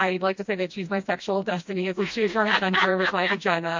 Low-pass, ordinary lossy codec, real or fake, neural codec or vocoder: 7.2 kHz; MP3, 48 kbps; fake; codec, 16 kHz, 1 kbps, FreqCodec, smaller model